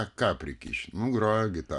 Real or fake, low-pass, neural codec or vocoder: real; 10.8 kHz; none